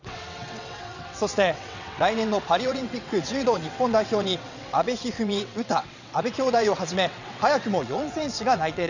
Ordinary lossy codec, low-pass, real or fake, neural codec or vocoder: none; 7.2 kHz; fake; vocoder, 22.05 kHz, 80 mel bands, WaveNeXt